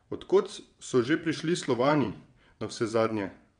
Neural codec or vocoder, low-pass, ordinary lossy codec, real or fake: vocoder, 22.05 kHz, 80 mel bands, WaveNeXt; 9.9 kHz; MP3, 64 kbps; fake